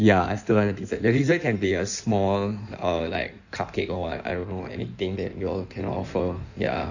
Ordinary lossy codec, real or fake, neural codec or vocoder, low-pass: none; fake; codec, 16 kHz in and 24 kHz out, 1.1 kbps, FireRedTTS-2 codec; 7.2 kHz